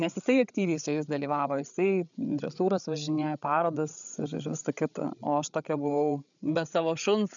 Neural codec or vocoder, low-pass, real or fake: codec, 16 kHz, 8 kbps, FreqCodec, larger model; 7.2 kHz; fake